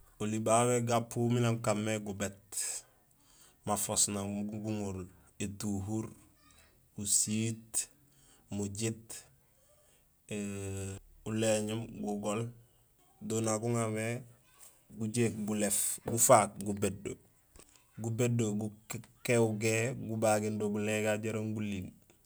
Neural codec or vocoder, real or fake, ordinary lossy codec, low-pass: none; real; none; none